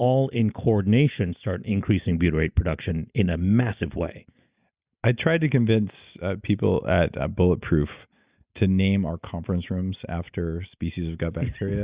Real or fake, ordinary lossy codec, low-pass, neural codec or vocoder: real; Opus, 64 kbps; 3.6 kHz; none